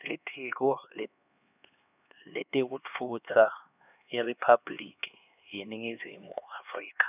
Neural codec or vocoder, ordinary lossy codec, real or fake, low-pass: codec, 16 kHz, 2 kbps, X-Codec, HuBERT features, trained on LibriSpeech; none; fake; 3.6 kHz